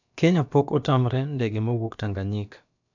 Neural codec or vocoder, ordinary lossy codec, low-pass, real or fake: codec, 16 kHz, about 1 kbps, DyCAST, with the encoder's durations; none; 7.2 kHz; fake